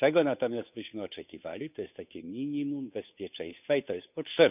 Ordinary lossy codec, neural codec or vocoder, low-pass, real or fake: none; codec, 16 kHz, 8 kbps, FunCodec, trained on Chinese and English, 25 frames a second; 3.6 kHz; fake